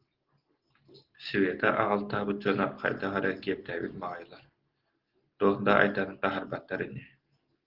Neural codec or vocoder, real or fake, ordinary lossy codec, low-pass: none; real; Opus, 16 kbps; 5.4 kHz